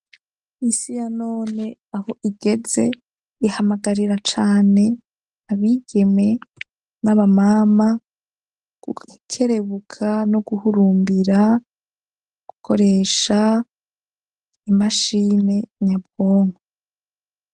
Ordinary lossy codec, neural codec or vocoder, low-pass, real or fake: Opus, 24 kbps; none; 10.8 kHz; real